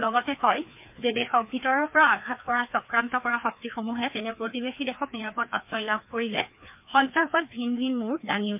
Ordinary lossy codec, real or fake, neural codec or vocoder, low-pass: MP3, 32 kbps; fake; codec, 16 kHz, 2 kbps, FreqCodec, larger model; 3.6 kHz